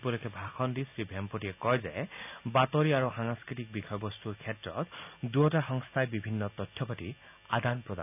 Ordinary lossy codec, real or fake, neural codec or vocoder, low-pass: none; real; none; 3.6 kHz